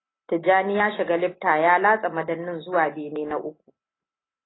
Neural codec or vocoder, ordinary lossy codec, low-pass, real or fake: none; AAC, 16 kbps; 7.2 kHz; real